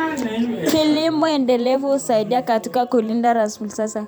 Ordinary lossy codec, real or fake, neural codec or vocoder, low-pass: none; fake; vocoder, 44.1 kHz, 128 mel bands every 512 samples, BigVGAN v2; none